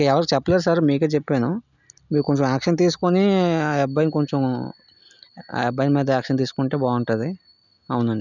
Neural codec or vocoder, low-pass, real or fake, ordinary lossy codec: none; 7.2 kHz; real; none